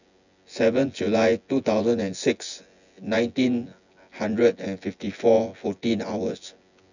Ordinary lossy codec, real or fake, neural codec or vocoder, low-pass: none; fake; vocoder, 24 kHz, 100 mel bands, Vocos; 7.2 kHz